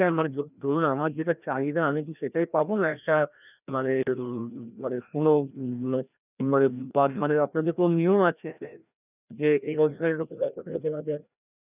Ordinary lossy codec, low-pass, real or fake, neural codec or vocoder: none; 3.6 kHz; fake; codec, 16 kHz, 1 kbps, FreqCodec, larger model